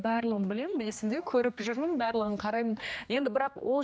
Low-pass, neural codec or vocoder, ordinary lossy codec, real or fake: none; codec, 16 kHz, 2 kbps, X-Codec, HuBERT features, trained on general audio; none; fake